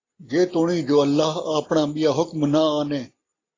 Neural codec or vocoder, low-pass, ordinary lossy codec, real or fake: none; 7.2 kHz; AAC, 32 kbps; real